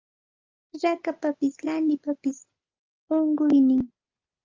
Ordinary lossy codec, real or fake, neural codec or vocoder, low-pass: Opus, 32 kbps; real; none; 7.2 kHz